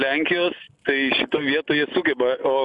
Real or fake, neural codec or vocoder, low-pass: real; none; 10.8 kHz